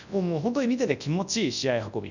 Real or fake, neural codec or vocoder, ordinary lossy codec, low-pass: fake; codec, 24 kHz, 0.9 kbps, WavTokenizer, large speech release; none; 7.2 kHz